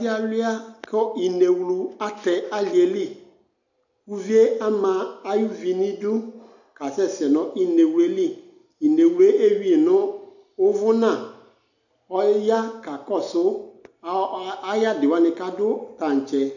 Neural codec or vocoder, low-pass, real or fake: none; 7.2 kHz; real